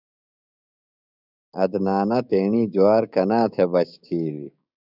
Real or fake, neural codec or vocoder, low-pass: fake; codec, 16 kHz, 6 kbps, DAC; 5.4 kHz